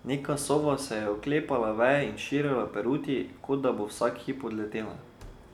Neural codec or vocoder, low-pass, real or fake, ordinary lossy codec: none; 19.8 kHz; real; none